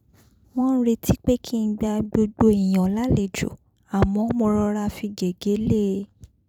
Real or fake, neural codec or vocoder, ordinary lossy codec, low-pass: fake; vocoder, 44.1 kHz, 128 mel bands every 256 samples, BigVGAN v2; none; 19.8 kHz